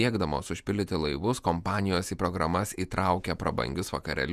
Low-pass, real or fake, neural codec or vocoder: 14.4 kHz; fake; vocoder, 48 kHz, 128 mel bands, Vocos